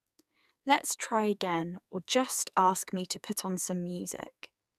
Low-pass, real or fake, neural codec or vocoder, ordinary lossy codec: 14.4 kHz; fake; codec, 44.1 kHz, 2.6 kbps, SNAC; none